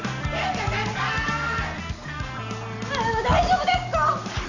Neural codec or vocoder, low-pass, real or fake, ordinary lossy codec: none; 7.2 kHz; real; none